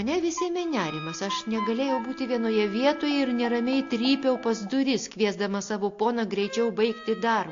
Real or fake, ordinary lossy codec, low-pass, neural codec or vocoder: real; AAC, 48 kbps; 7.2 kHz; none